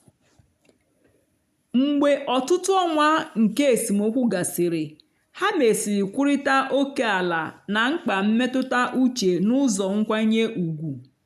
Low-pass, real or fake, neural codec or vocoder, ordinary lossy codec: 14.4 kHz; real; none; none